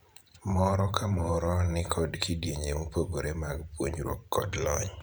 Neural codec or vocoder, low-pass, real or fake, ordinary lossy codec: vocoder, 44.1 kHz, 128 mel bands every 512 samples, BigVGAN v2; none; fake; none